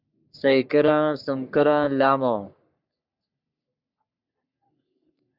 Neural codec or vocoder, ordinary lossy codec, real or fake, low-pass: codec, 44.1 kHz, 3.4 kbps, Pupu-Codec; Opus, 64 kbps; fake; 5.4 kHz